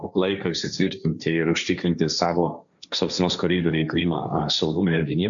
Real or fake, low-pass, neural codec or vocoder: fake; 7.2 kHz; codec, 16 kHz, 1.1 kbps, Voila-Tokenizer